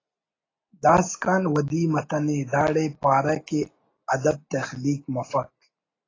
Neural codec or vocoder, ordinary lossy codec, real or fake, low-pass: none; AAC, 32 kbps; real; 7.2 kHz